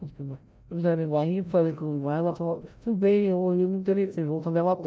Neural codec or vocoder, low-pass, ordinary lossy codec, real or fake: codec, 16 kHz, 0.5 kbps, FreqCodec, larger model; none; none; fake